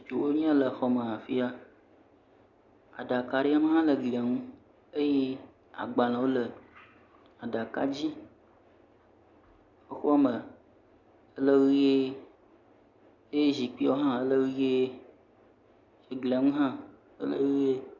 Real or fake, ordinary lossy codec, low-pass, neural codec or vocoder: real; Opus, 64 kbps; 7.2 kHz; none